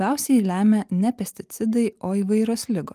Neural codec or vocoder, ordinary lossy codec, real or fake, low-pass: none; Opus, 32 kbps; real; 14.4 kHz